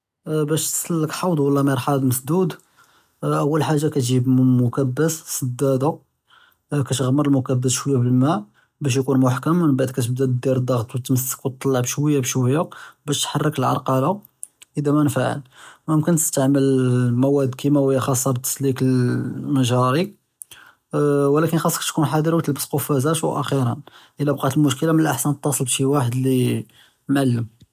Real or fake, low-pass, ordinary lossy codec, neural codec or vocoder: real; 14.4 kHz; none; none